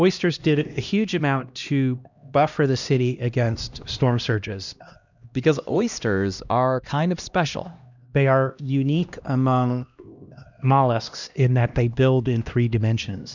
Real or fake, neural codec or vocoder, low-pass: fake; codec, 16 kHz, 1 kbps, X-Codec, HuBERT features, trained on LibriSpeech; 7.2 kHz